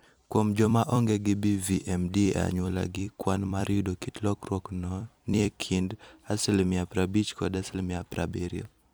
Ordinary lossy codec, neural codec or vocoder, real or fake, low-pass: none; vocoder, 44.1 kHz, 128 mel bands every 256 samples, BigVGAN v2; fake; none